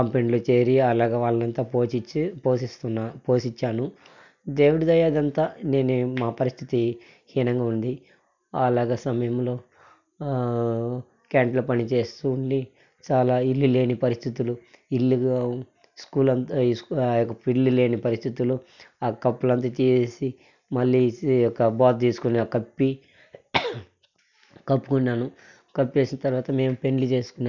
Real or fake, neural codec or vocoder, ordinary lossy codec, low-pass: real; none; none; 7.2 kHz